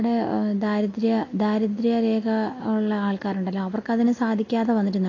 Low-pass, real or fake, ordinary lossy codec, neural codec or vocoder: 7.2 kHz; real; AAC, 32 kbps; none